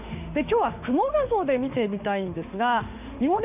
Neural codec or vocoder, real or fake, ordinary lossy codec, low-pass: autoencoder, 48 kHz, 32 numbers a frame, DAC-VAE, trained on Japanese speech; fake; none; 3.6 kHz